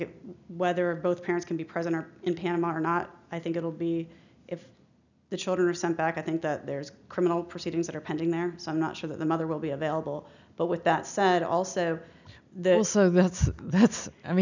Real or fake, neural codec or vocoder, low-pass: real; none; 7.2 kHz